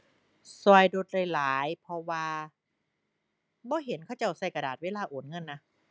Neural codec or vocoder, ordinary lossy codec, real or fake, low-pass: none; none; real; none